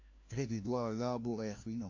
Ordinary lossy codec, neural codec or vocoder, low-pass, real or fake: AAC, 48 kbps; codec, 16 kHz, 1 kbps, FunCodec, trained on LibriTTS, 50 frames a second; 7.2 kHz; fake